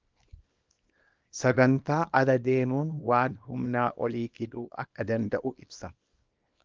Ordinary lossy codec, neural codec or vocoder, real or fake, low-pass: Opus, 32 kbps; codec, 24 kHz, 0.9 kbps, WavTokenizer, small release; fake; 7.2 kHz